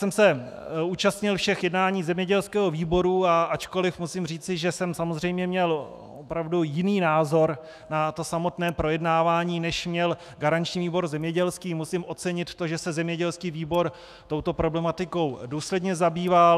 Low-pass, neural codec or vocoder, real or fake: 14.4 kHz; autoencoder, 48 kHz, 128 numbers a frame, DAC-VAE, trained on Japanese speech; fake